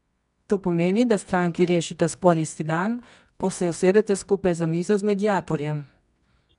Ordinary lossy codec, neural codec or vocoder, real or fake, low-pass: none; codec, 24 kHz, 0.9 kbps, WavTokenizer, medium music audio release; fake; 10.8 kHz